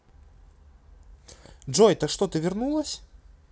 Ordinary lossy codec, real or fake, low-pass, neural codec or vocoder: none; real; none; none